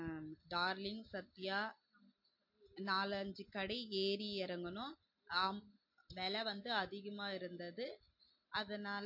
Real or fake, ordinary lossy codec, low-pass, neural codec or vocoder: real; MP3, 32 kbps; 5.4 kHz; none